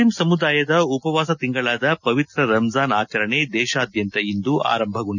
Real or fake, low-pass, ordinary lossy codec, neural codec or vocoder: real; 7.2 kHz; none; none